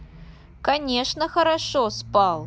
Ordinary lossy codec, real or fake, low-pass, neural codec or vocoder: none; real; none; none